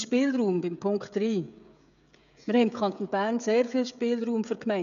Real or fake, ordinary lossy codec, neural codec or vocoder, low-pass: fake; none; codec, 16 kHz, 16 kbps, FreqCodec, smaller model; 7.2 kHz